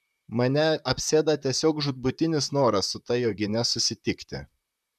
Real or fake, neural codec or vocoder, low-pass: fake; vocoder, 44.1 kHz, 128 mel bands, Pupu-Vocoder; 14.4 kHz